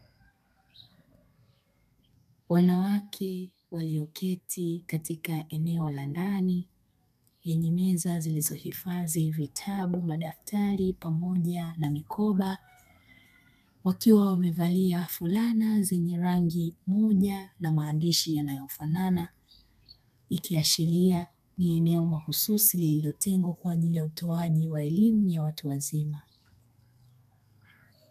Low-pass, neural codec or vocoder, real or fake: 14.4 kHz; codec, 32 kHz, 1.9 kbps, SNAC; fake